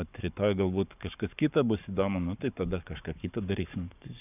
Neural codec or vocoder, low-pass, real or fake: codec, 44.1 kHz, 7.8 kbps, Pupu-Codec; 3.6 kHz; fake